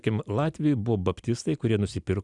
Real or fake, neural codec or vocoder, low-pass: real; none; 10.8 kHz